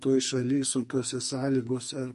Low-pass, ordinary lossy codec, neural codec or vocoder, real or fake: 14.4 kHz; MP3, 48 kbps; codec, 44.1 kHz, 2.6 kbps, SNAC; fake